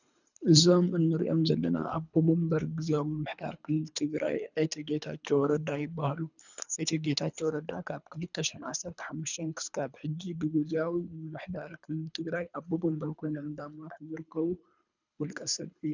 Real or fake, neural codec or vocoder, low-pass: fake; codec, 24 kHz, 3 kbps, HILCodec; 7.2 kHz